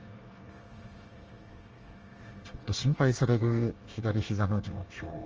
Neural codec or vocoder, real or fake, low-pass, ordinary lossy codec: codec, 24 kHz, 1 kbps, SNAC; fake; 7.2 kHz; Opus, 24 kbps